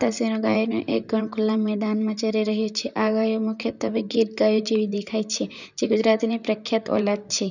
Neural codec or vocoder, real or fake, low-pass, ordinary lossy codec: vocoder, 44.1 kHz, 128 mel bands, Pupu-Vocoder; fake; 7.2 kHz; none